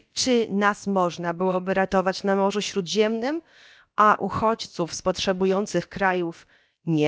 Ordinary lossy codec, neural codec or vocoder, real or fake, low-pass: none; codec, 16 kHz, about 1 kbps, DyCAST, with the encoder's durations; fake; none